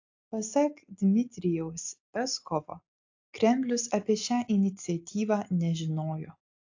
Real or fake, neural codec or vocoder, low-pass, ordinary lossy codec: real; none; 7.2 kHz; AAC, 48 kbps